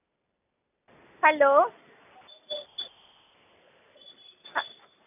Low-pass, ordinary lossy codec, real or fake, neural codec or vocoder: 3.6 kHz; none; real; none